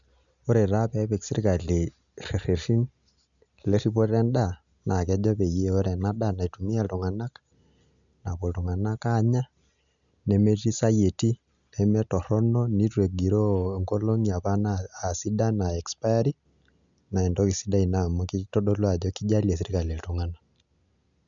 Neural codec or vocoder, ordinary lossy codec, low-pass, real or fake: none; none; 7.2 kHz; real